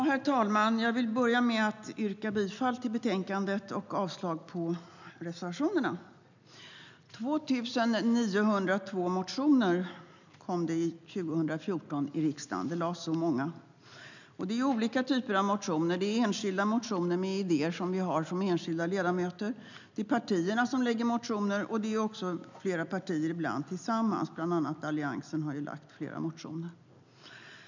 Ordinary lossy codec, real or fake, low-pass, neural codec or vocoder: none; real; 7.2 kHz; none